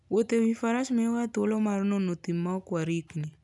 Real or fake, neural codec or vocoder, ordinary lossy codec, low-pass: real; none; none; 10.8 kHz